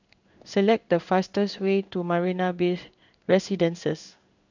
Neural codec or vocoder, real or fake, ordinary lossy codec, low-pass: codec, 16 kHz in and 24 kHz out, 1 kbps, XY-Tokenizer; fake; none; 7.2 kHz